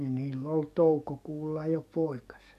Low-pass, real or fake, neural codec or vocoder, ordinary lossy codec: 14.4 kHz; fake; codec, 44.1 kHz, 7.8 kbps, DAC; none